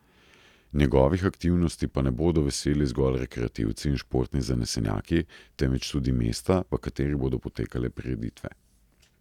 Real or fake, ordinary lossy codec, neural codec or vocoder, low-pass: real; none; none; 19.8 kHz